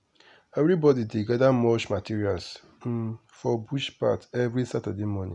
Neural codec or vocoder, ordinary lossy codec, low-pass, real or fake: none; none; 10.8 kHz; real